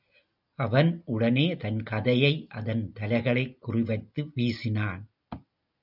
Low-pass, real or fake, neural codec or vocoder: 5.4 kHz; real; none